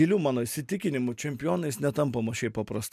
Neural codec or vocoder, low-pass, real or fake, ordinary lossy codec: none; 14.4 kHz; real; MP3, 96 kbps